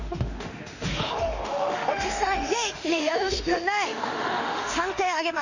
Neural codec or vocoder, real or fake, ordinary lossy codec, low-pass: autoencoder, 48 kHz, 32 numbers a frame, DAC-VAE, trained on Japanese speech; fake; none; 7.2 kHz